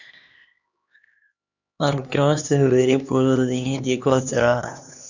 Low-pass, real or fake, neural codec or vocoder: 7.2 kHz; fake; codec, 16 kHz, 2 kbps, X-Codec, HuBERT features, trained on LibriSpeech